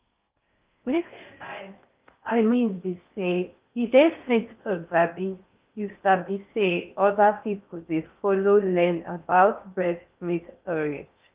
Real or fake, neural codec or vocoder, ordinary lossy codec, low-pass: fake; codec, 16 kHz in and 24 kHz out, 0.6 kbps, FocalCodec, streaming, 4096 codes; Opus, 24 kbps; 3.6 kHz